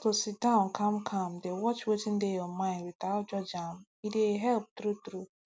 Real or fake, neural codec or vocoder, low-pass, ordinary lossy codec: real; none; none; none